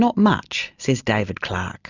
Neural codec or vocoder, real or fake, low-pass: none; real; 7.2 kHz